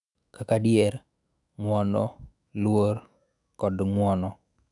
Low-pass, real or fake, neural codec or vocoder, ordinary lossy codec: 10.8 kHz; fake; autoencoder, 48 kHz, 128 numbers a frame, DAC-VAE, trained on Japanese speech; none